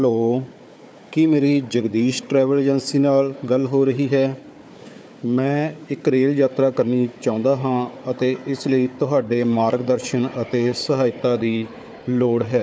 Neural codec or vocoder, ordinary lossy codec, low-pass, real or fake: codec, 16 kHz, 4 kbps, FunCodec, trained on Chinese and English, 50 frames a second; none; none; fake